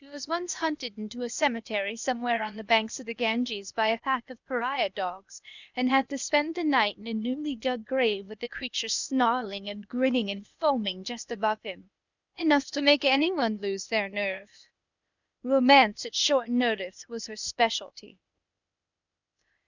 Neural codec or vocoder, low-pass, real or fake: codec, 16 kHz, 0.8 kbps, ZipCodec; 7.2 kHz; fake